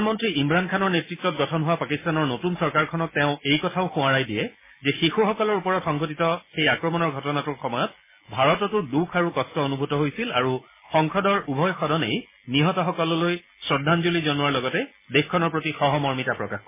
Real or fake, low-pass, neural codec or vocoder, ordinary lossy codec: real; 3.6 kHz; none; MP3, 16 kbps